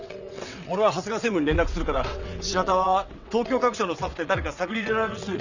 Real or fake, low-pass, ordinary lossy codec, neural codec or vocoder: fake; 7.2 kHz; none; vocoder, 22.05 kHz, 80 mel bands, WaveNeXt